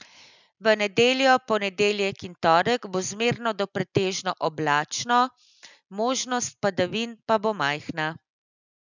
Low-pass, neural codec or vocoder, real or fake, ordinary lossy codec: 7.2 kHz; none; real; none